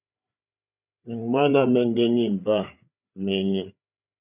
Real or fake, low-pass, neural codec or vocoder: fake; 3.6 kHz; codec, 16 kHz, 8 kbps, FreqCodec, larger model